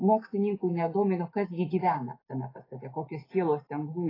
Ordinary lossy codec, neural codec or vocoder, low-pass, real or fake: AAC, 24 kbps; none; 5.4 kHz; real